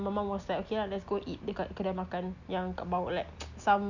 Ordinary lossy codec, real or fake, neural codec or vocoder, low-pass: none; real; none; 7.2 kHz